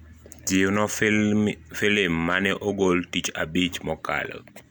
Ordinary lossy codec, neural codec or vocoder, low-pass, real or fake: none; none; none; real